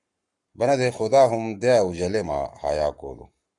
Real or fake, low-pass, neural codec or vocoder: fake; 10.8 kHz; codec, 44.1 kHz, 7.8 kbps, Pupu-Codec